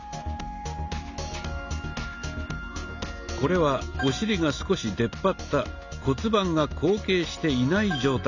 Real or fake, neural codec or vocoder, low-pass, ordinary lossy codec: real; none; 7.2 kHz; none